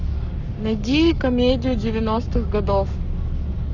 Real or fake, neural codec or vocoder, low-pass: fake; codec, 44.1 kHz, 7.8 kbps, Pupu-Codec; 7.2 kHz